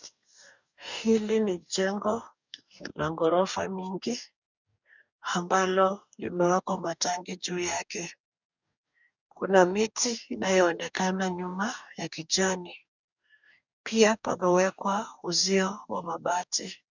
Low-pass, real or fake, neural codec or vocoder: 7.2 kHz; fake; codec, 44.1 kHz, 2.6 kbps, DAC